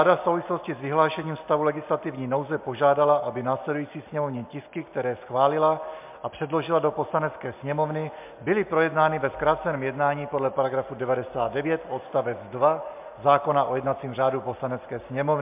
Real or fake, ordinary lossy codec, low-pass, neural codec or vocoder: real; MP3, 32 kbps; 3.6 kHz; none